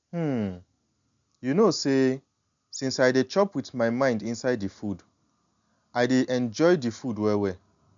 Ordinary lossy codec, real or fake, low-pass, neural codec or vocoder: MP3, 96 kbps; real; 7.2 kHz; none